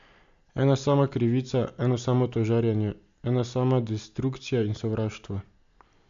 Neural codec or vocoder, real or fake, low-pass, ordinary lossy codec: none; real; 7.2 kHz; AAC, 64 kbps